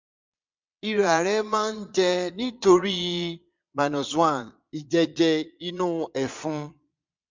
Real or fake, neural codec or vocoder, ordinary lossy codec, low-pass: fake; vocoder, 22.05 kHz, 80 mel bands, Vocos; MP3, 64 kbps; 7.2 kHz